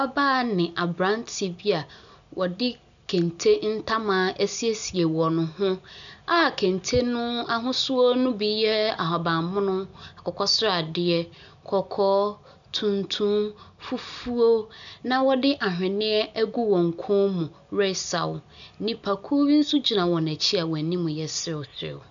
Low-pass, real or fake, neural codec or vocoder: 7.2 kHz; real; none